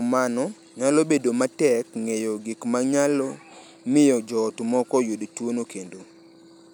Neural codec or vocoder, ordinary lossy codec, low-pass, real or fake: none; none; none; real